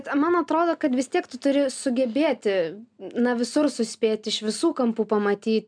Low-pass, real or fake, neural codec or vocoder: 9.9 kHz; real; none